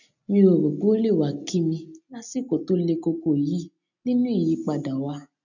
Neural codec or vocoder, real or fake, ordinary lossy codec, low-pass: none; real; none; 7.2 kHz